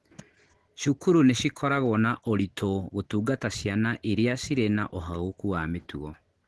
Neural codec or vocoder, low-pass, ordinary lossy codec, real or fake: none; 10.8 kHz; Opus, 16 kbps; real